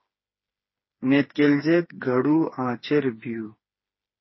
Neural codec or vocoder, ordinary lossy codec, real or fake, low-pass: codec, 16 kHz, 4 kbps, FreqCodec, smaller model; MP3, 24 kbps; fake; 7.2 kHz